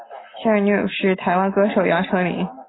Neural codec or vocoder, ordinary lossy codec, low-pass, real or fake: none; AAC, 16 kbps; 7.2 kHz; real